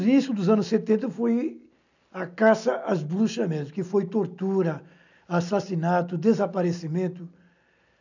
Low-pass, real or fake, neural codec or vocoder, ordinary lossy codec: 7.2 kHz; real; none; none